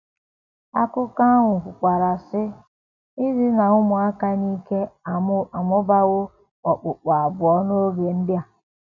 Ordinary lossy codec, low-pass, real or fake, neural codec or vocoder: none; 7.2 kHz; real; none